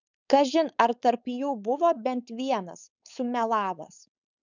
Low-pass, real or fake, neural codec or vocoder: 7.2 kHz; fake; codec, 16 kHz, 4.8 kbps, FACodec